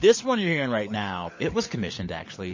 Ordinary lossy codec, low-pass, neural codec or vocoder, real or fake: MP3, 32 kbps; 7.2 kHz; codec, 16 kHz, 16 kbps, FunCodec, trained on LibriTTS, 50 frames a second; fake